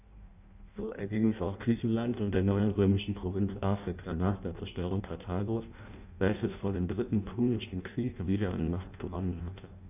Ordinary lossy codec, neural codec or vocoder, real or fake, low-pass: none; codec, 16 kHz in and 24 kHz out, 0.6 kbps, FireRedTTS-2 codec; fake; 3.6 kHz